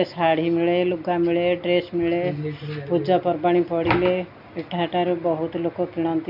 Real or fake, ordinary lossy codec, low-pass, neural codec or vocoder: real; none; 5.4 kHz; none